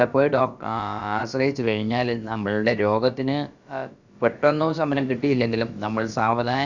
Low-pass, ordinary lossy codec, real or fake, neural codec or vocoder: 7.2 kHz; Opus, 64 kbps; fake; codec, 16 kHz, about 1 kbps, DyCAST, with the encoder's durations